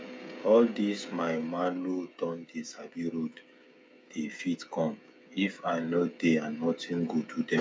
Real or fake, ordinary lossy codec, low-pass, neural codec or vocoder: fake; none; none; codec, 16 kHz, 16 kbps, FreqCodec, smaller model